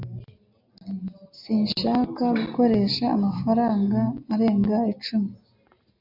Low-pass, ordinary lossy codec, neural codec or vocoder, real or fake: 5.4 kHz; MP3, 48 kbps; none; real